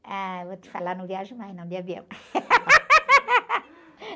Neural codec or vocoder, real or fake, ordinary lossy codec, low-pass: none; real; none; none